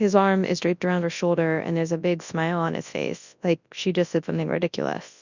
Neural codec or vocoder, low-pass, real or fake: codec, 24 kHz, 0.9 kbps, WavTokenizer, large speech release; 7.2 kHz; fake